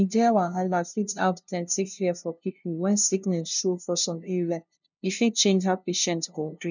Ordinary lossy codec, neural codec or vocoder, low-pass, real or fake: none; codec, 16 kHz, 0.5 kbps, FunCodec, trained on LibriTTS, 25 frames a second; 7.2 kHz; fake